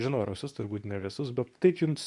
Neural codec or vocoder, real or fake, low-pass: codec, 24 kHz, 0.9 kbps, WavTokenizer, medium speech release version 2; fake; 10.8 kHz